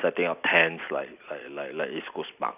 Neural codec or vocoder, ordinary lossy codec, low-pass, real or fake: none; none; 3.6 kHz; real